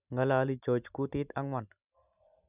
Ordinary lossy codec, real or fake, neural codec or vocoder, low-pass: none; real; none; 3.6 kHz